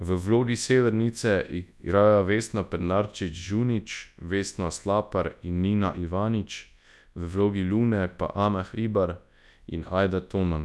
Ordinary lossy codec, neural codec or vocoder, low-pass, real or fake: none; codec, 24 kHz, 0.9 kbps, WavTokenizer, large speech release; none; fake